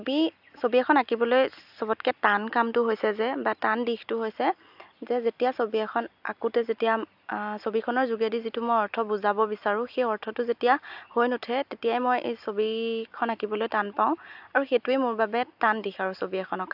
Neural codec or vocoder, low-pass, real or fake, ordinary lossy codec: none; 5.4 kHz; real; AAC, 48 kbps